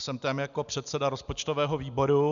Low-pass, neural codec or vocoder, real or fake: 7.2 kHz; none; real